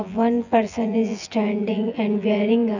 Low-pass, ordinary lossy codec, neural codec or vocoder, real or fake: 7.2 kHz; none; vocoder, 24 kHz, 100 mel bands, Vocos; fake